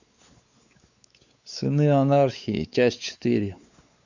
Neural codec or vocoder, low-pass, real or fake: codec, 16 kHz, 4 kbps, X-Codec, WavLM features, trained on Multilingual LibriSpeech; 7.2 kHz; fake